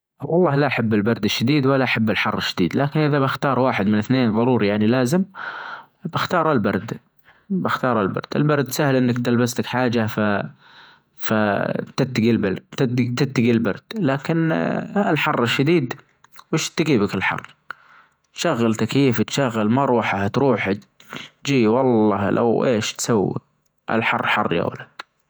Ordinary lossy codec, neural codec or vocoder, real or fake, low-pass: none; vocoder, 48 kHz, 128 mel bands, Vocos; fake; none